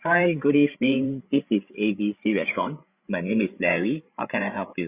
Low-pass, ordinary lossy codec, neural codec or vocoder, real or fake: 3.6 kHz; Opus, 64 kbps; codec, 16 kHz, 8 kbps, FreqCodec, larger model; fake